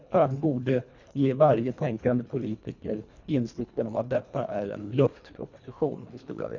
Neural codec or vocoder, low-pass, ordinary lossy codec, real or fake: codec, 24 kHz, 1.5 kbps, HILCodec; 7.2 kHz; none; fake